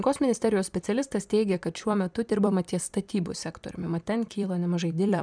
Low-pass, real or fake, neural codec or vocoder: 9.9 kHz; fake; vocoder, 44.1 kHz, 128 mel bands every 256 samples, BigVGAN v2